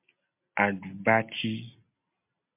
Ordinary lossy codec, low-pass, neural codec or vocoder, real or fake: MP3, 32 kbps; 3.6 kHz; none; real